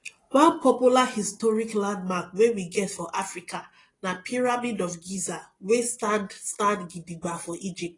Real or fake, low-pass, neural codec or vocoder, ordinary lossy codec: real; 10.8 kHz; none; AAC, 32 kbps